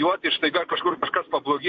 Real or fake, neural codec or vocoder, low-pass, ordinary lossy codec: real; none; 7.2 kHz; MP3, 48 kbps